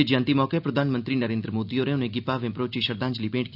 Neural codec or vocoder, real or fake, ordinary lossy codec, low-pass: none; real; none; 5.4 kHz